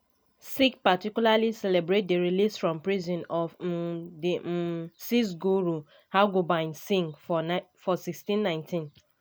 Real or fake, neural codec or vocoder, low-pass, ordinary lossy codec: real; none; none; none